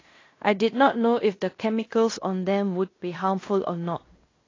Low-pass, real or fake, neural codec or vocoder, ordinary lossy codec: 7.2 kHz; fake; codec, 16 kHz in and 24 kHz out, 0.9 kbps, LongCat-Audio-Codec, fine tuned four codebook decoder; AAC, 32 kbps